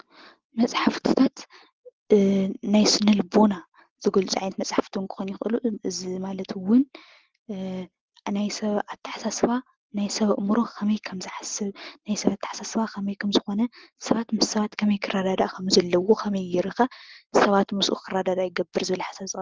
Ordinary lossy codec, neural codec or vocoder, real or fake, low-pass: Opus, 16 kbps; none; real; 7.2 kHz